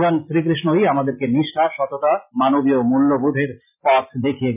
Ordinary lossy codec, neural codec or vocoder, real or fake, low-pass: none; none; real; 3.6 kHz